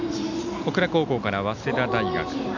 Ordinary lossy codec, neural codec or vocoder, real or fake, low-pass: none; none; real; 7.2 kHz